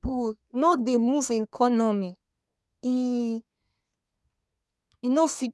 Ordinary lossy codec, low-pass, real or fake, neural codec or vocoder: none; none; fake; codec, 24 kHz, 1 kbps, SNAC